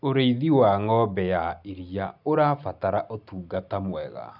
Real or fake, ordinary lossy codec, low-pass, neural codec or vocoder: real; none; 5.4 kHz; none